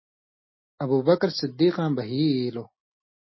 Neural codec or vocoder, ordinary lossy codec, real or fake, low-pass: none; MP3, 24 kbps; real; 7.2 kHz